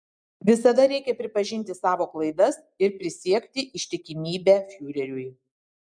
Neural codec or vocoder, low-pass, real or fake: none; 9.9 kHz; real